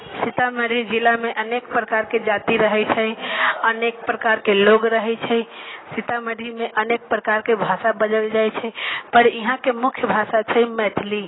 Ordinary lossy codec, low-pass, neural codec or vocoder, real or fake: AAC, 16 kbps; 7.2 kHz; none; real